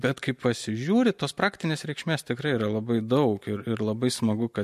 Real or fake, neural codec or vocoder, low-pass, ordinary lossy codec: real; none; 14.4 kHz; MP3, 64 kbps